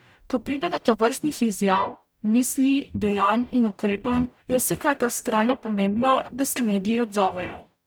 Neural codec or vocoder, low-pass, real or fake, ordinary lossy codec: codec, 44.1 kHz, 0.9 kbps, DAC; none; fake; none